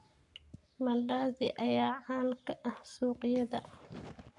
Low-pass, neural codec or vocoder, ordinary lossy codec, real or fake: 10.8 kHz; codec, 44.1 kHz, 7.8 kbps, Pupu-Codec; none; fake